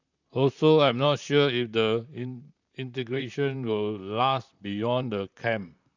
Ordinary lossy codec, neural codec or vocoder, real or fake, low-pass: none; vocoder, 44.1 kHz, 128 mel bands, Pupu-Vocoder; fake; 7.2 kHz